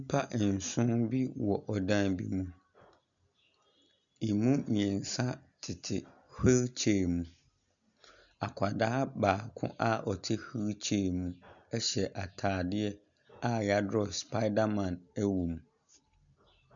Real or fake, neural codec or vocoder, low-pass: real; none; 7.2 kHz